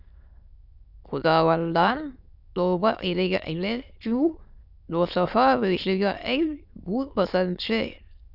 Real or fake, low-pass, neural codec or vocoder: fake; 5.4 kHz; autoencoder, 22.05 kHz, a latent of 192 numbers a frame, VITS, trained on many speakers